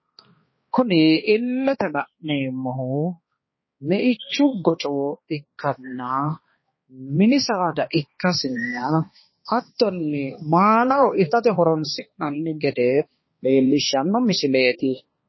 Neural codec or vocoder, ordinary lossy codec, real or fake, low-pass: codec, 16 kHz, 2 kbps, X-Codec, HuBERT features, trained on balanced general audio; MP3, 24 kbps; fake; 7.2 kHz